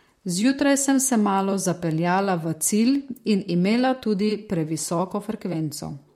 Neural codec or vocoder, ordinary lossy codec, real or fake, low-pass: vocoder, 44.1 kHz, 128 mel bands, Pupu-Vocoder; MP3, 64 kbps; fake; 19.8 kHz